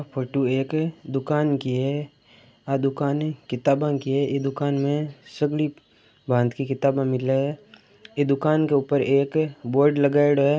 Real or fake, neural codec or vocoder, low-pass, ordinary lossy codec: real; none; none; none